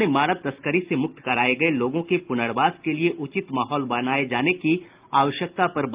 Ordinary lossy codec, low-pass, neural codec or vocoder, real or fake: Opus, 24 kbps; 3.6 kHz; none; real